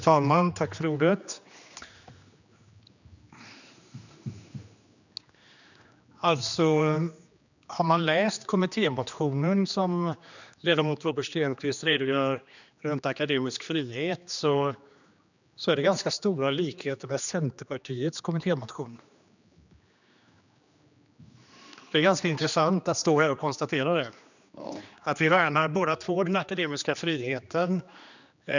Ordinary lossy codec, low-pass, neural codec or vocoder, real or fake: none; 7.2 kHz; codec, 16 kHz, 2 kbps, X-Codec, HuBERT features, trained on general audio; fake